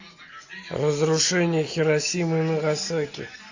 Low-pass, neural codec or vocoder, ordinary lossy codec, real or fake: 7.2 kHz; none; AAC, 48 kbps; real